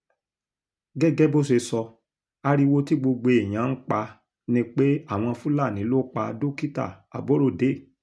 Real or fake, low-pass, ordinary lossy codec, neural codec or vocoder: real; none; none; none